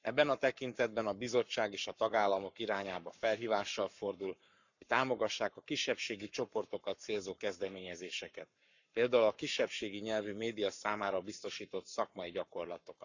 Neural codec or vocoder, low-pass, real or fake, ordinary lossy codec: codec, 44.1 kHz, 7.8 kbps, Pupu-Codec; 7.2 kHz; fake; none